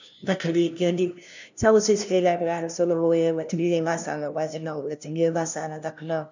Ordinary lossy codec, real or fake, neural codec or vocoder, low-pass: none; fake; codec, 16 kHz, 0.5 kbps, FunCodec, trained on LibriTTS, 25 frames a second; 7.2 kHz